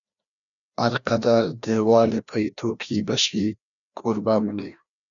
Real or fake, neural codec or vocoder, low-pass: fake; codec, 16 kHz, 1 kbps, FreqCodec, larger model; 7.2 kHz